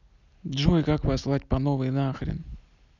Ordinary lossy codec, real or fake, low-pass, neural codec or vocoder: none; real; 7.2 kHz; none